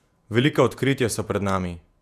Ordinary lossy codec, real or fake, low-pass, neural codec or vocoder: none; fake; 14.4 kHz; vocoder, 48 kHz, 128 mel bands, Vocos